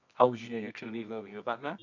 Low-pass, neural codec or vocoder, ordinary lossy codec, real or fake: 7.2 kHz; codec, 24 kHz, 0.9 kbps, WavTokenizer, medium music audio release; none; fake